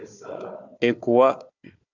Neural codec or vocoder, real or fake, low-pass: codec, 16 kHz, 4 kbps, FunCodec, trained on Chinese and English, 50 frames a second; fake; 7.2 kHz